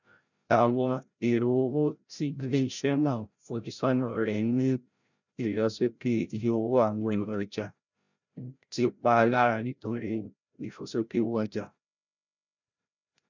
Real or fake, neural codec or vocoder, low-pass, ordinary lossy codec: fake; codec, 16 kHz, 0.5 kbps, FreqCodec, larger model; 7.2 kHz; none